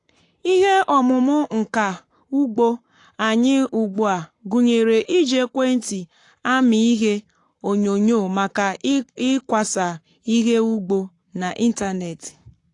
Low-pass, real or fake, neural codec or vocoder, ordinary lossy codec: 10.8 kHz; fake; codec, 44.1 kHz, 7.8 kbps, Pupu-Codec; AAC, 48 kbps